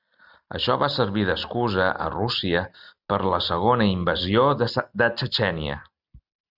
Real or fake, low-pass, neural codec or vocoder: real; 5.4 kHz; none